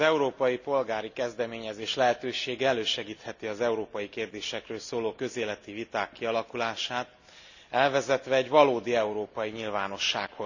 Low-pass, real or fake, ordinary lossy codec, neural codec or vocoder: 7.2 kHz; real; none; none